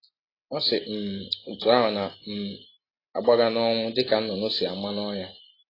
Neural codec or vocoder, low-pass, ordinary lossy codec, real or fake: none; 5.4 kHz; AAC, 24 kbps; real